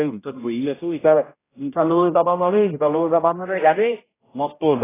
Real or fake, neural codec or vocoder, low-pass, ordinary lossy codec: fake; codec, 16 kHz, 0.5 kbps, X-Codec, HuBERT features, trained on general audio; 3.6 kHz; AAC, 16 kbps